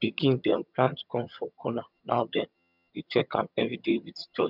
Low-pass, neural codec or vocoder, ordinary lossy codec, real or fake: 5.4 kHz; vocoder, 22.05 kHz, 80 mel bands, HiFi-GAN; none; fake